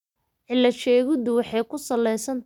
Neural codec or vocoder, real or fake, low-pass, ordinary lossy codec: none; real; 19.8 kHz; none